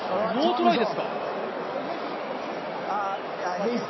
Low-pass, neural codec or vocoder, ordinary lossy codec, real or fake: 7.2 kHz; none; MP3, 24 kbps; real